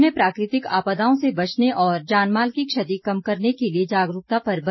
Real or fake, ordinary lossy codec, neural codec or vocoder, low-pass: real; MP3, 24 kbps; none; 7.2 kHz